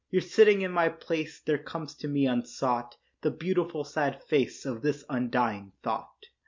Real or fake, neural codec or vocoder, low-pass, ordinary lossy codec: real; none; 7.2 kHz; MP3, 64 kbps